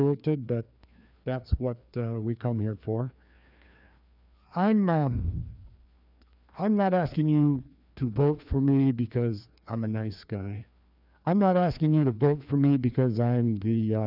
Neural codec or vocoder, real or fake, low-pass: codec, 16 kHz, 2 kbps, FreqCodec, larger model; fake; 5.4 kHz